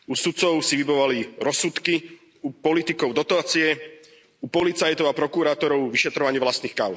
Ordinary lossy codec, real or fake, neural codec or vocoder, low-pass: none; real; none; none